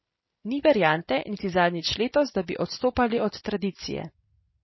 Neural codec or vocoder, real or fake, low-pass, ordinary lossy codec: none; real; 7.2 kHz; MP3, 24 kbps